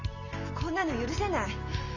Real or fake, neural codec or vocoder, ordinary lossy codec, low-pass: real; none; none; 7.2 kHz